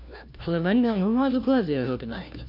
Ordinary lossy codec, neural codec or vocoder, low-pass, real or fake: none; codec, 16 kHz, 1 kbps, FunCodec, trained on LibriTTS, 50 frames a second; 5.4 kHz; fake